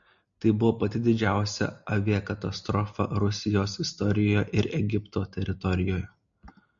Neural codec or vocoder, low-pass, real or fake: none; 7.2 kHz; real